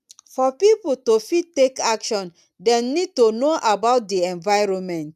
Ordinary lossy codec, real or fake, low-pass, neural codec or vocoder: none; real; 14.4 kHz; none